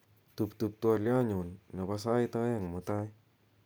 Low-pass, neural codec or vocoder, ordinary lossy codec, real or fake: none; none; none; real